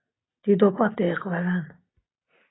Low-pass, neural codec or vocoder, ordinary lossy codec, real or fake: 7.2 kHz; none; AAC, 16 kbps; real